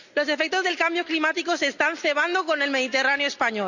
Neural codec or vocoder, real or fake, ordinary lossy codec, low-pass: none; real; none; 7.2 kHz